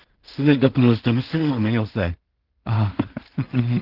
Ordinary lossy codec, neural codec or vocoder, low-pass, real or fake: Opus, 16 kbps; codec, 16 kHz in and 24 kHz out, 0.4 kbps, LongCat-Audio-Codec, two codebook decoder; 5.4 kHz; fake